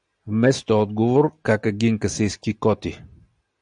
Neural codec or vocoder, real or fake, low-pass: none; real; 9.9 kHz